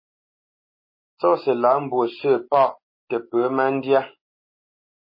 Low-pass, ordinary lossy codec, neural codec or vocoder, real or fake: 5.4 kHz; MP3, 24 kbps; none; real